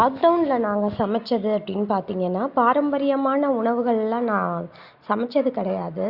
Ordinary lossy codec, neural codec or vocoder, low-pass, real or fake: Opus, 64 kbps; none; 5.4 kHz; real